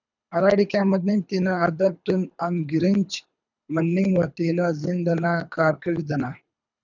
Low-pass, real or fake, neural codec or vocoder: 7.2 kHz; fake; codec, 24 kHz, 3 kbps, HILCodec